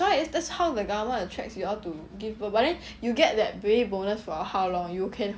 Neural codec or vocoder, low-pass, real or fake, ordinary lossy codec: none; none; real; none